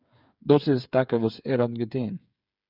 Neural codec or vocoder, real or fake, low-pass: codec, 16 kHz, 8 kbps, FreqCodec, smaller model; fake; 5.4 kHz